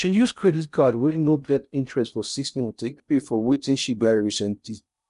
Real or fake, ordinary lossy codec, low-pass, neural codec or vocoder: fake; none; 10.8 kHz; codec, 16 kHz in and 24 kHz out, 0.6 kbps, FocalCodec, streaming, 4096 codes